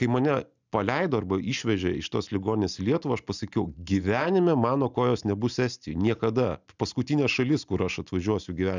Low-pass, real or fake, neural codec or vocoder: 7.2 kHz; real; none